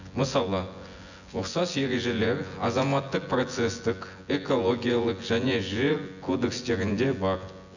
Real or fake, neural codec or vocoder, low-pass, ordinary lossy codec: fake; vocoder, 24 kHz, 100 mel bands, Vocos; 7.2 kHz; none